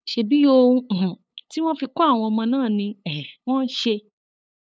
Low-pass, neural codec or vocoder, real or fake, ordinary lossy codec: none; codec, 16 kHz, 8 kbps, FunCodec, trained on LibriTTS, 25 frames a second; fake; none